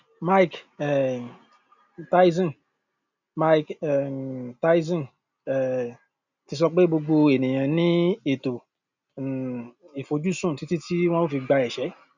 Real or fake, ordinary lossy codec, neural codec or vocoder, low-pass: real; none; none; 7.2 kHz